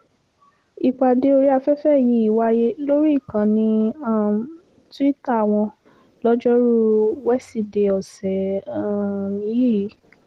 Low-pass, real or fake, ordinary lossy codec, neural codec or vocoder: 14.4 kHz; real; Opus, 16 kbps; none